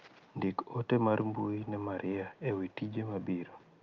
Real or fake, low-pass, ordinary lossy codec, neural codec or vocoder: real; 7.2 kHz; Opus, 24 kbps; none